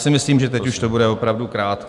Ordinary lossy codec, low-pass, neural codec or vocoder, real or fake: MP3, 96 kbps; 10.8 kHz; none; real